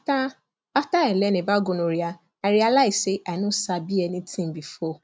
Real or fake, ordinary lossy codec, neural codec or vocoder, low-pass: real; none; none; none